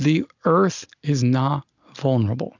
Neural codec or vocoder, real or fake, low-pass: none; real; 7.2 kHz